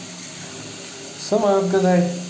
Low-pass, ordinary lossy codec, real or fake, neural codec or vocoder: none; none; real; none